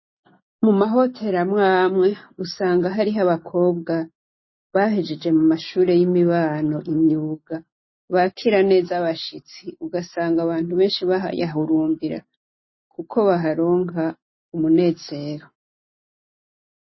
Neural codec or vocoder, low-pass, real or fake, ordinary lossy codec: none; 7.2 kHz; real; MP3, 24 kbps